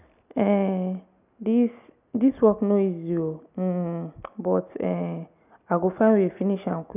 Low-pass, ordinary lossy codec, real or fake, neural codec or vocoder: 3.6 kHz; none; real; none